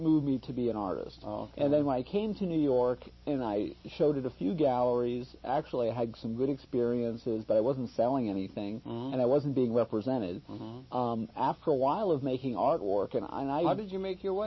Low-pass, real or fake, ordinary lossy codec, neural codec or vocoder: 7.2 kHz; real; MP3, 24 kbps; none